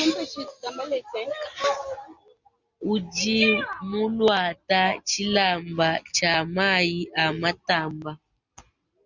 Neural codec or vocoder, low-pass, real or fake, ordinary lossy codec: none; 7.2 kHz; real; Opus, 64 kbps